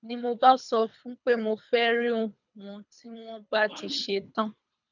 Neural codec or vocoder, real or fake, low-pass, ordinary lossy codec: codec, 24 kHz, 3 kbps, HILCodec; fake; 7.2 kHz; none